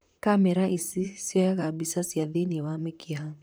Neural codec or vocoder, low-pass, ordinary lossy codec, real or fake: vocoder, 44.1 kHz, 128 mel bands, Pupu-Vocoder; none; none; fake